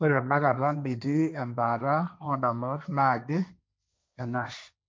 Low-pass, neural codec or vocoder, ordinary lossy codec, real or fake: none; codec, 16 kHz, 1.1 kbps, Voila-Tokenizer; none; fake